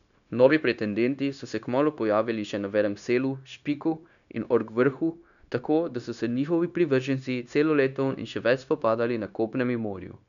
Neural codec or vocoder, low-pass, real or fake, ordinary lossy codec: codec, 16 kHz, 0.9 kbps, LongCat-Audio-Codec; 7.2 kHz; fake; none